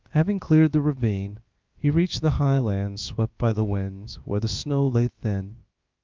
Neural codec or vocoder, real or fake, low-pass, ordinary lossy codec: codec, 16 kHz, about 1 kbps, DyCAST, with the encoder's durations; fake; 7.2 kHz; Opus, 24 kbps